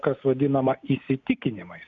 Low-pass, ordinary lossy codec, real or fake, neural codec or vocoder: 7.2 kHz; MP3, 96 kbps; real; none